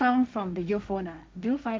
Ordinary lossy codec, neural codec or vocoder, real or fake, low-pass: none; codec, 16 kHz, 1.1 kbps, Voila-Tokenizer; fake; none